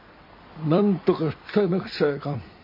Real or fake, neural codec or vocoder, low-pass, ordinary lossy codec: fake; vocoder, 44.1 kHz, 128 mel bands every 256 samples, BigVGAN v2; 5.4 kHz; MP3, 32 kbps